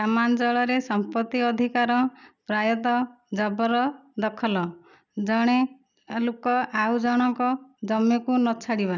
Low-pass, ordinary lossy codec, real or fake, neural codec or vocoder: 7.2 kHz; none; real; none